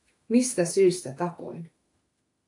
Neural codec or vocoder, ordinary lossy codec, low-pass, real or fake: autoencoder, 48 kHz, 32 numbers a frame, DAC-VAE, trained on Japanese speech; AAC, 48 kbps; 10.8 kHz; fake